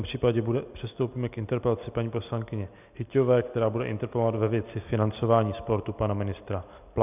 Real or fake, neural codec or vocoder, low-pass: real; none; 3.6 kHz